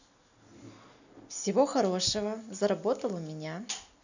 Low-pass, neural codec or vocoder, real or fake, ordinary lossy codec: 7.2 kHz; none; real; none